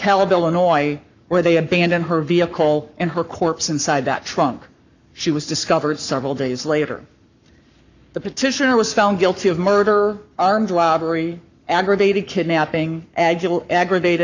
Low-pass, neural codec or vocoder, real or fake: 7.2 kHz; codec, 44.1 kHz, 7.8 kbps, Pupu-Codec; fake